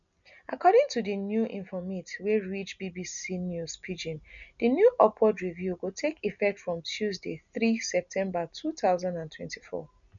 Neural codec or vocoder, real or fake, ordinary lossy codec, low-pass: none; real; none; 7.2 kHz